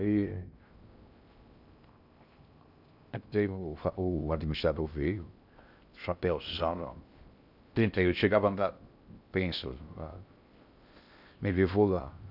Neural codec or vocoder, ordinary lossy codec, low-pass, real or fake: codec, 16 kHz in and 24 kHz out, 0.6 kbps, FocalCodec, streaming, 2048 codes; none; 5.4 kHz; fake